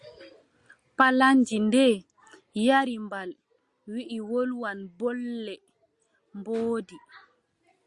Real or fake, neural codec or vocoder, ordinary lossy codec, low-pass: real; none; Opus, 64 kbps; 10.8 kHz